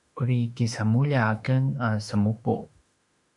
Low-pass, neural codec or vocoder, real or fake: 10.8 kHz; autoencoder, 48 kHz, 32 numbers a frame, DAC-VAE, trained on Japanese speech; fake